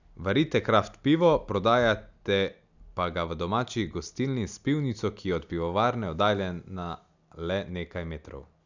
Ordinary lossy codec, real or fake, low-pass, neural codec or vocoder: none; real; 7.2 kHz; none